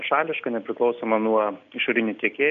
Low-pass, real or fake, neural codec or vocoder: 7.2 kHz; real; none